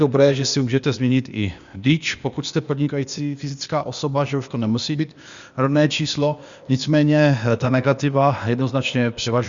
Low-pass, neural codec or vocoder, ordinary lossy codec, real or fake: 7.2 kHz; codec, 16 kHz, 0.8 kbps, ZipCodec; Opus, 64 kbps; fake